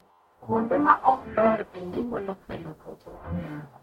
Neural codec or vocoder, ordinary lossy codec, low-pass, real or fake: codec, 44.1 kHz, 0.9 kbps, DAC; MP3, 64 kbps; 19.8 kHz; fake